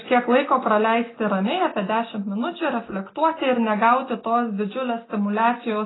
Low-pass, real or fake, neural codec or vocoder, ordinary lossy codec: 7.2 kHz; real; none; AAC, 16 kbps